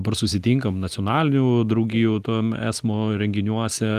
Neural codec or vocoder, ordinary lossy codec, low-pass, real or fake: none; Opus, 24 kbps; 14.4 kHz; real